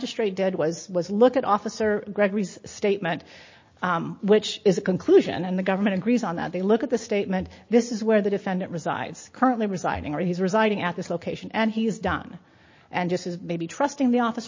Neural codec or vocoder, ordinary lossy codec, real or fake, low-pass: none; MP3, 32 kbps; real; 7.2 kHz